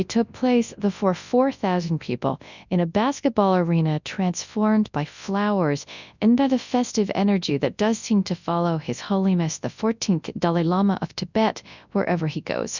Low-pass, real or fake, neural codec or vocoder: 7.2 kHz; fake; codec, 24 kHz, 0.9 kbps, WavTokenizer, large speech release